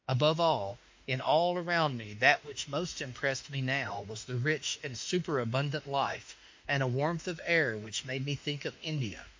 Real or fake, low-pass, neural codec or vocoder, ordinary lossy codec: fake; 7.2 kHz; autoencoder, 48 kHz, 32 numbers a frame, DAC-VAE, trained on Japanese speech; MP3, 48 kbps